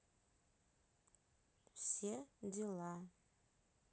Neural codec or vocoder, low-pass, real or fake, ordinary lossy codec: none; none; real; none